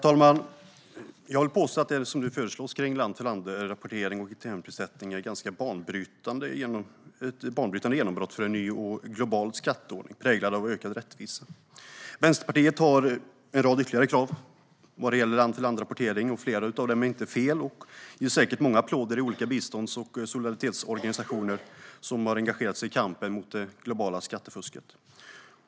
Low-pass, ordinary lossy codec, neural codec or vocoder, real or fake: none; none; none; real